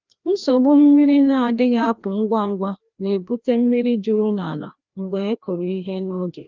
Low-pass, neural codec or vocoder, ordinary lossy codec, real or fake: 7.2 kHz; codec, 16 kHz, 1 kbps, FreqCodec, larger model; Opus, 32 kbps; fake